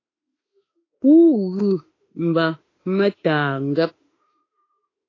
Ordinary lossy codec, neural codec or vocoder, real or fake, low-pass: AAC, 32 kbps; autoencoder, 48 kHz, 32 numbers a frame, DAC-VAE, trained on Japanese speech; fake; 7.2 kHz